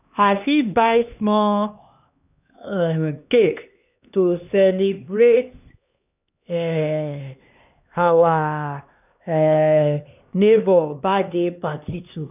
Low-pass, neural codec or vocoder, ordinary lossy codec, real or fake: 3.6 kHz; codec, 16 kHz, 2 kbps, X-Codec, HuBERT features, trained on LibriSpeech; none; fake